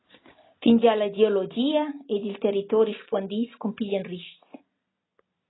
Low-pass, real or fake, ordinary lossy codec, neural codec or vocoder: 7.2 kHz; real; AAC, 16 kbps; none